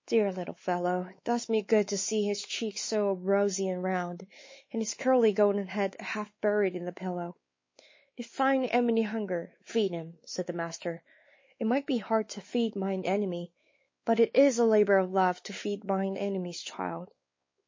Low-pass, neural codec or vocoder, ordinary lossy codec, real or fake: 7.2 kHz; codec, 16 kHz, 4 kbps, X-Codec, WavLM features, trained on Multilingual LibriSpeech; MP3, 32 kbps; fake